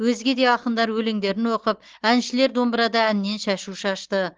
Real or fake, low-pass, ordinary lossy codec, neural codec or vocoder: real; 7.2 kHz; Opus, 16 kbps; none